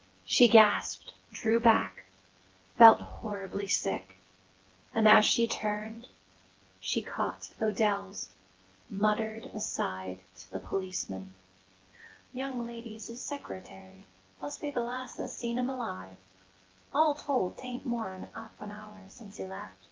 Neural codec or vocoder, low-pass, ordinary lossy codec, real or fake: vocoder, 24 kHz, 100 mel bands, Vocos; 7.2 kHz; Opus, 16 kbps; fake